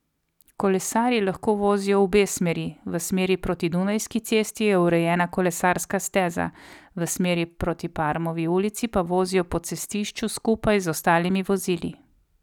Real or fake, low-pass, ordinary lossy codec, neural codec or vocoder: real; 19.8 kHz; none; none